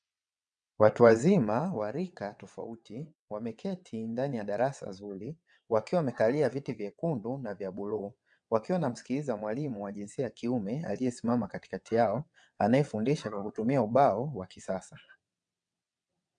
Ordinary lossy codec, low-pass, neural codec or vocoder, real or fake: MP3, 96 kbps; 9.9 kHz; vocoder, 22.05 kHz, 80 mel bands, WaveNeXt; fake